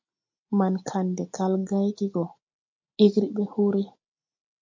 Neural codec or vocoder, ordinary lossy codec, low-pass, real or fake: none; MP3, 48 kbps; 7.2 kHz; real